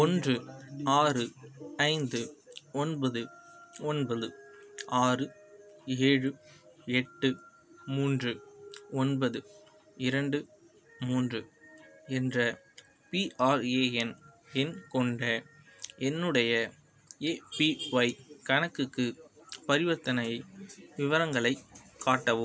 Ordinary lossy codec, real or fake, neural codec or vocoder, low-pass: none; real; none; none